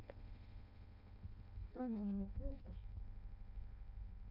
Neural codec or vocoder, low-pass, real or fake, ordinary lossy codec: codec, 16 kHz in and 24 kHz out, 0.6 kbps, FireRedTTS-2 codec; 5.4 kHz; fake; none